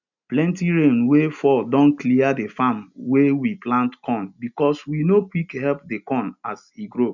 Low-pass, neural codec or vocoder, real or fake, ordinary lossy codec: 7.2 kHz; none; real; none